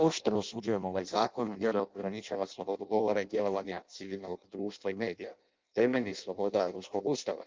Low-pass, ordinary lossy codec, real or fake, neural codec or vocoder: 7.2 kHz; Opus, 24 kbps; fake; codec, 16 kHz in and 24 kHz out, 0.6 kbps, FireRedTTS-2 codec